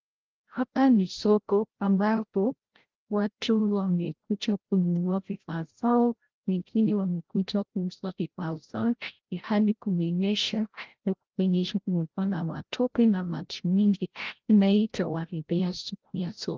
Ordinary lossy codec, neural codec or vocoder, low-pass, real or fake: Opus, 16 kbps; codec, 16 kHz, 0.5 kbps, FreqCodec, larger model; 7.2 kHz; fake